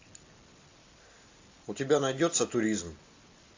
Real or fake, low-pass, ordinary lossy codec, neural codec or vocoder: real; 7.2 kHz; AAC, 48 kbps; none